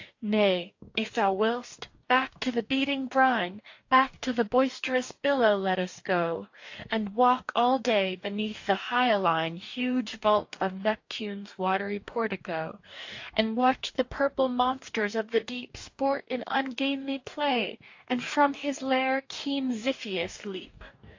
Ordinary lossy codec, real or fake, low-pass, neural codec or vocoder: AAC, 48 kbps; fake; 7.2 kHz; codec, 44.1 kHz, 2.6 kbps, DAC